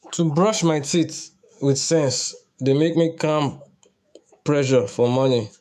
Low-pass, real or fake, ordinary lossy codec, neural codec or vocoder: 14.4 kHz; fake; none; autoencoder, 48 kHz, 128 numbers a frame, DAC-VAE, trained on Japanese speech